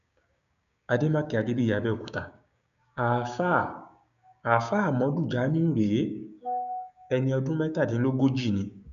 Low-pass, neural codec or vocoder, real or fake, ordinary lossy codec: 7.2 kHz; codec, 16 kHz, 6 kbps, DAC; fake; MP3, 96 kbps